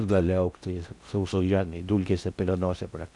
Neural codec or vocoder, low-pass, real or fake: codec, 16 kHz in and 24 kHz out, 0.6 kbps, FocalCodec, streaming, 4096 codes; 10.8 kHz; fake